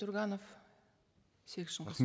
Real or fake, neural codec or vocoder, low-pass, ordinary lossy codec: real; none; none; none